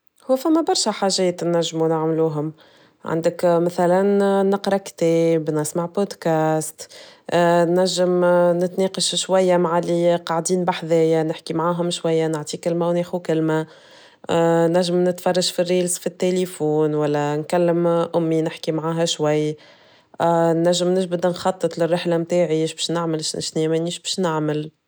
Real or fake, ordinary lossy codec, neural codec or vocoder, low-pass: real; none; none; none